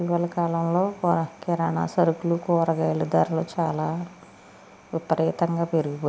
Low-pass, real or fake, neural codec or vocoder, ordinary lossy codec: none; real; none; none